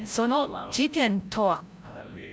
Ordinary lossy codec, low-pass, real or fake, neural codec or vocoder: none; none; fake; codec, 16 kHz, 0.5 kbps, FreqCodec, larger model